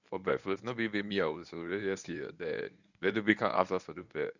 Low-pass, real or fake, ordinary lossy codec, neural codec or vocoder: 7.2 kHz; fake; none; codec, 24 kHz, 0.9 kbps, WavTokenizer, medium speech release version 1